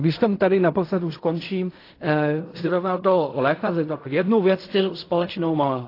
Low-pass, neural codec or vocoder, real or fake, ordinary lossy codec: 5.4 kHz; codec, 16 kHz in and 24 kHz out, 0.4 kbps, LongCat-Audio-Codec, fine tuned four codebook decoder; fake; AAC, 32 kbps